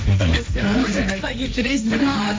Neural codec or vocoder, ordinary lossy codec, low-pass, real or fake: codec, 16 kHz, 1.1 kbps, Voila-Tokenizer; none; none; fake